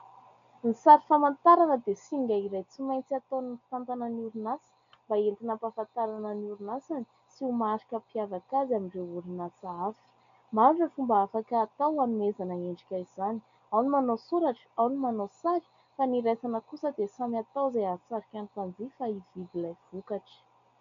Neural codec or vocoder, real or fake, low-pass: none; real; 7.2 kHz